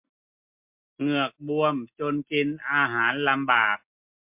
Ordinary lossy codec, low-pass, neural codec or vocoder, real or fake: MP3, 32 kbps; 3.6 kHz; none; real